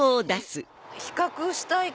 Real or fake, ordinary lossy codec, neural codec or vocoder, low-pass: real; none; none; none